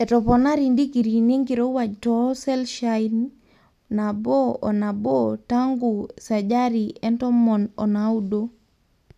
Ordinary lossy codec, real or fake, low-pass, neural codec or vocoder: none; real; 14.4 kHz; none